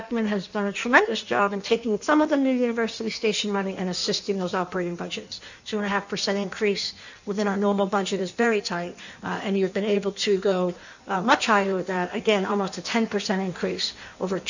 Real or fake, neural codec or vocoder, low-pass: fake; codec, 16 kHz in and 24 kHz out, 1.1 kbps, FireRedTTS-2 codec; 7.2 kHz